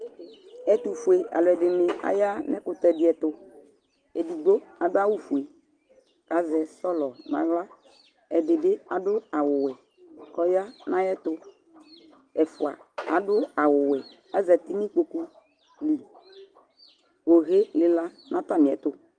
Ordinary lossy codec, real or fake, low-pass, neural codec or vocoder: Opus, 24 kbps; real; 9.9 kHz; none